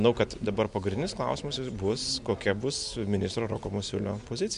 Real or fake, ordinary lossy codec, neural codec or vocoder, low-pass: real; MP3, 64 kbps; none; 10.8 kHz